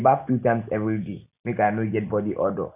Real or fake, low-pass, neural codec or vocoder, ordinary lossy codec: real; 3.6 kHz; none; none